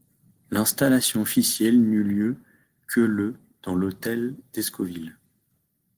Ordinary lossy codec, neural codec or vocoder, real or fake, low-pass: Opus, 24 kbps; none; real; 14.4 kHz